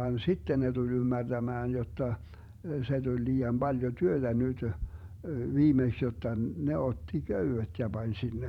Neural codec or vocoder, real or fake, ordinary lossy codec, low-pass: vocoder, 44.1 kHz, 128 mel bands every 512 samples, BigVGAN v2; fake; none; 19.8 kHz